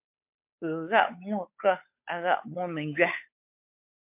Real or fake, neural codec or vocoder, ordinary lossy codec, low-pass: fake; codec, 16 kHz, 8 kbps, FunCodec, trained on Chinese and English, 25 frames a second; MP3, 32 kbps; 3.6 kHz